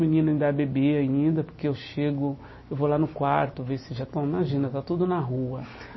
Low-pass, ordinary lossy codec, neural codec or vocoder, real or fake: 7.2 kHz; MP3, 24 kbps; none; real